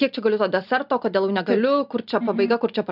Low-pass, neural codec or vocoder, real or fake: 5.4 kHz; none; real